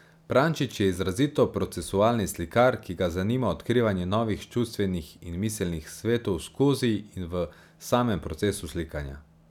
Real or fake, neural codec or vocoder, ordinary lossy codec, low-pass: real; none; none; 19.8 kHz